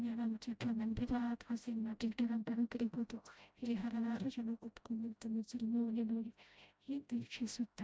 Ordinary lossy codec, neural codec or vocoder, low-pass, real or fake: none; codec, 16 kHz, 0.5 kbps, FreqCodec, smaller model; none; fake